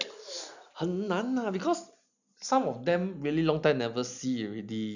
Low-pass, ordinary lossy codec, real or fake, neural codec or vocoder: 7.2 kHz; none; real; none